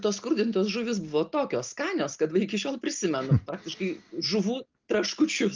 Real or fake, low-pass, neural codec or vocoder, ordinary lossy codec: real; 7.2 kHz; none; Opus, 24 kbps